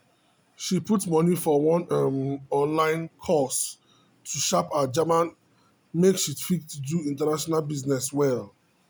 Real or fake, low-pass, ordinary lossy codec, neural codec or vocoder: real; none; none; none